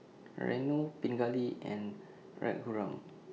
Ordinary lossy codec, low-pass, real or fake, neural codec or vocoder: none; none; real; none